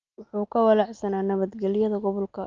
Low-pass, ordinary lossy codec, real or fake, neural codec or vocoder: 7.2 kHz; Opus, 24 kbps; real; none